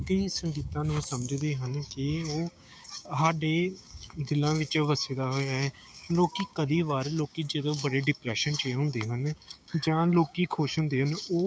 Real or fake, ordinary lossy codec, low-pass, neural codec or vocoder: fake; none; none; codec, 16 kHz, 6 kbps, DAC